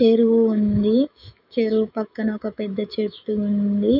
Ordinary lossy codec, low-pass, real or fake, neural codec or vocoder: none; 5.4 kHz; fake; autoencoder, 48 kHz, 128 numbers a frame, DAC-VAE, trained on Japanese speech